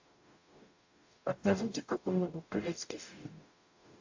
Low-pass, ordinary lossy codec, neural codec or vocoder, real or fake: 7.2 kHz; AAC, 48 kbps; codec, 44.1 kHz, 0.9 kbps, DAC; fake